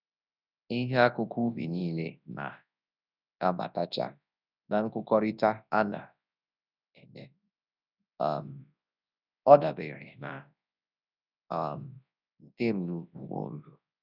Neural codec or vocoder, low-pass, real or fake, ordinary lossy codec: codec, 24 kHz, 0.9 kbps, WavTokenizer, large speech release; 5.4 kHz; fake; none